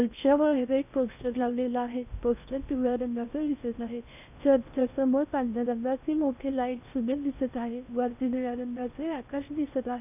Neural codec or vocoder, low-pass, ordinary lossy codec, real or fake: codec, 16 kHz in and 24 kHz out, 0.6 kbps, FocalCodec, streaming, 4096 codes; 3.6 kHz; none; fake